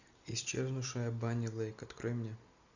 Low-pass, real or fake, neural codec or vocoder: 7.2 kHz; real; none